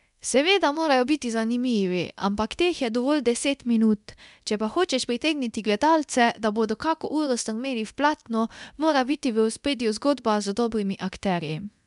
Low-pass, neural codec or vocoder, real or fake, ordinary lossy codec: 10.8 kHz; codec, 24 kHz, 0.9 kbps, DualCodec; fake; MP3, 96 kbps